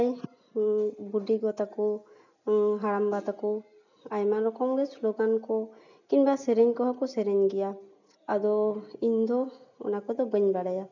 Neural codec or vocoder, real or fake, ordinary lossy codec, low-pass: none; real; none; 7.2 kHz